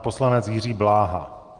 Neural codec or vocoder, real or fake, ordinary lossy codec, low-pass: none; real; Opus, 24 kbps; 9.9 kHz